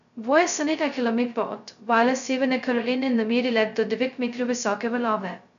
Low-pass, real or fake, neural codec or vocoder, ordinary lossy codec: 7.2 kHz; fake; codec, 16 kHz, 0.2 kbps, FocalCodec; none